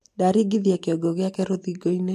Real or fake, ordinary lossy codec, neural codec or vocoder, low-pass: real; MP3, 64 kbps; none; 14.4 kHz